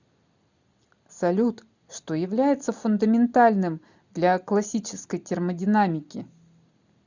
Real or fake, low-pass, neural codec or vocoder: real; 7.2 kHz; none